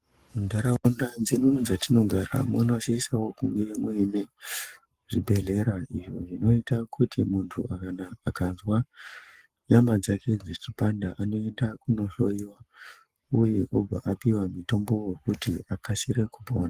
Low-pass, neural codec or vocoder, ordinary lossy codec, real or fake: 14.4 kHz; codec, 44.1 kHz, 7.8 kbps, Pupu-Codec; Opus, 24 kbps; fake